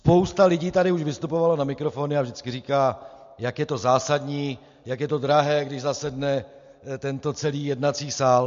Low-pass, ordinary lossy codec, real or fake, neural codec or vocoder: 7.2 kHz; MP3, 48 kbps; real; none